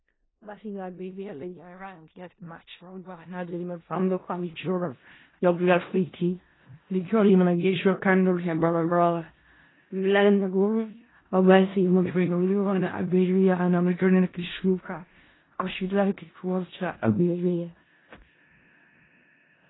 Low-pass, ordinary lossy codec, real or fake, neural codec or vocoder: 7.2 kHz; AAC, 16 kbps; fake; codec, 16 kHz in and 24 kHz out, 0.4 kbps, LongCat-Audio-Codec, four codebook decoder